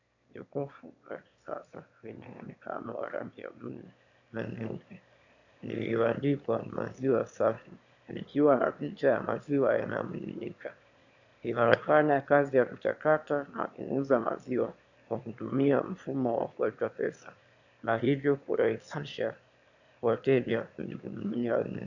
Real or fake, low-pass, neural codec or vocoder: fake; 7.2 kHz; autoencoder, 22.05 kHz, a latent of 192 numbers a frame, VITS, trained on one speaker